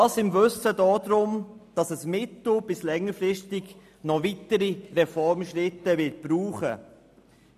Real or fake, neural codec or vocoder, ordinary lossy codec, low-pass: real; none; none; 14.4 kHz